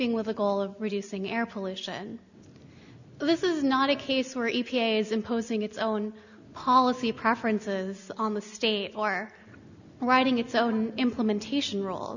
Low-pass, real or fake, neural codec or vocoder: 7.2 kHz; real; none